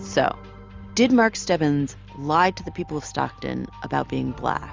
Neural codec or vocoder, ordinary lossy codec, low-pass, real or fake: none; Opus, 32 kbps; 7.2 kHz; real